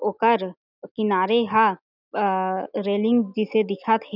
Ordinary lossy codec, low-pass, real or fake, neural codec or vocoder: none; 5.4 kHz; real; none